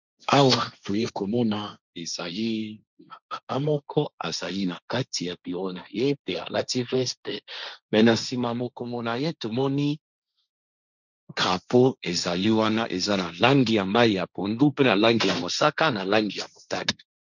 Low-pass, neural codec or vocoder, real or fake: 7.2 kHz; codec, 16 kHz, 1.1 kbps, Voila-Tokenizer; fake